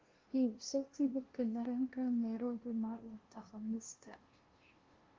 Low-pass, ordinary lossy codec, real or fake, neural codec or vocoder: 7.2 kHz; Opus, 16 kbps; fake; codec, 16 kHz, 0.5 kbps, FunCodec, trained on LibriTTS, 25 frames a second